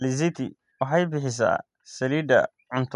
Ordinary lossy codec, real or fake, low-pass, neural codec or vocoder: none; real; 10.8 kHz; none